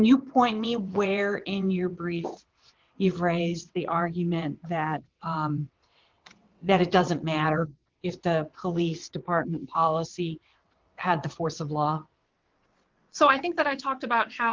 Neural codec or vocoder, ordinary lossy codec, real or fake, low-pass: vocoder, 22.05 kHz, 80 mel bands, WaveNeXt; Opus, 32 kbps; fake; 7.2 kHz